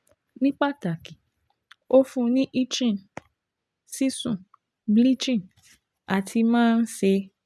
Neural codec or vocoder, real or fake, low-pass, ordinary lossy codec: none; real; none; none